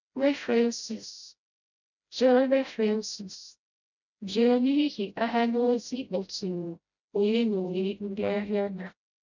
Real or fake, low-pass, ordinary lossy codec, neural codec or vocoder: fake; 7.2 kHz; none; codec, 16 kHz, 0.5 kbps, FreqCodec, smaller model